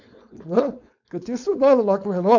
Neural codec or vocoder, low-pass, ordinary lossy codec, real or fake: codec, 16 kHz, 4.8 kbps, FACodec; 7.2 kHz; none; fake